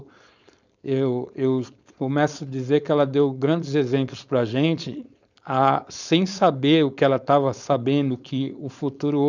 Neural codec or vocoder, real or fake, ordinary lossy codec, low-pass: codec, 16 kHz, 4.8 kbps, FACodec; fake; none; 7.2 kHz